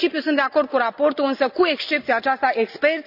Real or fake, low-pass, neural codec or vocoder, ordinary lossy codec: real; 5.4 kHz; none; none